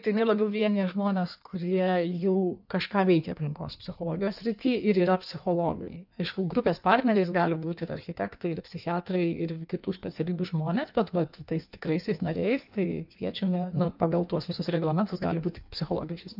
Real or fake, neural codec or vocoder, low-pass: fake; codec, 16 kHz in and 24 kHz out, 1.1 kbps, FireRedTTS-2 codec; 5.4 kHz